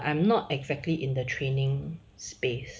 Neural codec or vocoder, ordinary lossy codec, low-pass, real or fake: none; none; none; real